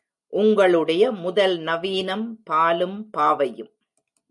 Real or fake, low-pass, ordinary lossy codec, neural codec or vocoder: real; 10.8 kHz; MP3, 96 kbps; none